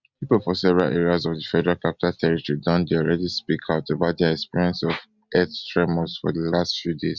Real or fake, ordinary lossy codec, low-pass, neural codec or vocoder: real; Opus, 64 kbps; 7.2 kHz; none